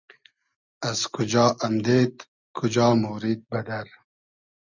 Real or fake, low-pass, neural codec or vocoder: real; 7.2 kHz; none